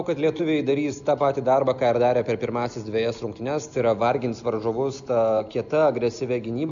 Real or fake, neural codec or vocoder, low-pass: real; none; 7.2 kHz